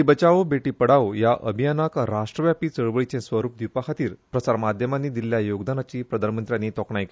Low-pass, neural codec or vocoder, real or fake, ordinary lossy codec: none; none; real; none